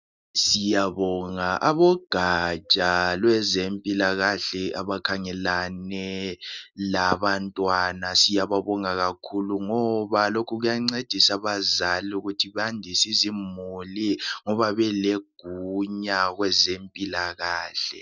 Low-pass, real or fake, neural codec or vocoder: 7.2 kHz; real; none